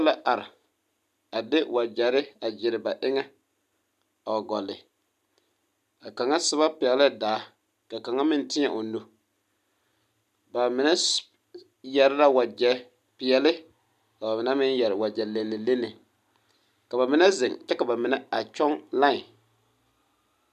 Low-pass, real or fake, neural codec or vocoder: 14.4 kHz; real; none